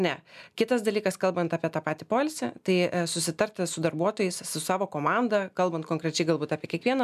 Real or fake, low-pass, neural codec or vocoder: real; 14.4 kHz; none